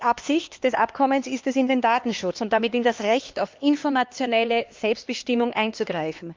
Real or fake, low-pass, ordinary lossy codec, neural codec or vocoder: fake; 7.2 kHz; Opus, 24 kbps; codec, 16 kHz, 2 kbps, X-Codec, HuBERT features, trained on LibriSpeech